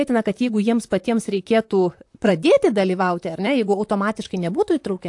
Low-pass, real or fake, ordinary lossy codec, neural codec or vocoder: 10.8 kHz; fake; AAC, 64 kbps; vocoder, 44.1 kHz, 128 mel bands, Pupu-Vocoder